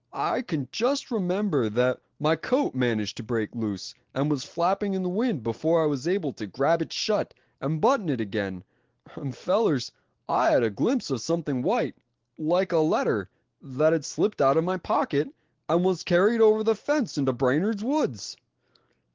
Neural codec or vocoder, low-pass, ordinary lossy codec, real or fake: none; 7.2 kHz; Opus, 16 kbps; real